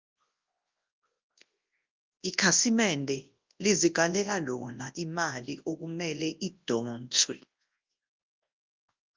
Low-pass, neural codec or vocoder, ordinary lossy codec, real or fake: 7.2 kHz; codec, 24 kHz, 0.9 kbps, WavTokenizer, large speech release; Opus, 24 kbps; fake